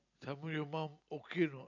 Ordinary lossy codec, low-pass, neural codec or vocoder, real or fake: none; 7.2 kHz; none; real